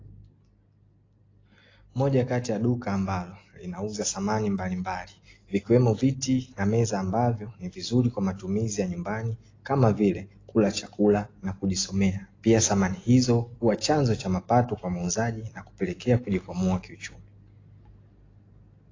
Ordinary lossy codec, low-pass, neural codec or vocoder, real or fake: AAC, 32 kbps; 7.2 kHz; none; real